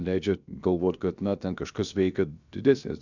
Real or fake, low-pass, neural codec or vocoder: fake; 7.2 kHz; codec, 16 kHz, about 1 kbps, DyCAST, with the encoder's durations